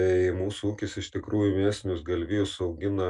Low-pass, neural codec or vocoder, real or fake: 10.8 kHz; none; real